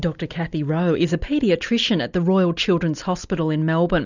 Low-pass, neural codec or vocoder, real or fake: 7.2 kHz; none; real